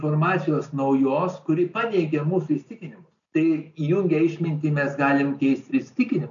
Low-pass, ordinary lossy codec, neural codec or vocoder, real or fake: 7.2 kHz; MP3, 96 kbps; none; real